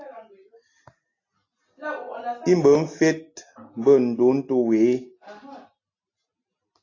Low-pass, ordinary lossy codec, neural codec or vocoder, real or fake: 7.2 kHz; AAC, 32 kbps; none; real